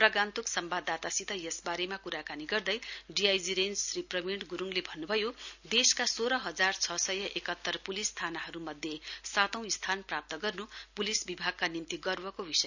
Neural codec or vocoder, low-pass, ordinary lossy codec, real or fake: none; 7.2 kHz; none; real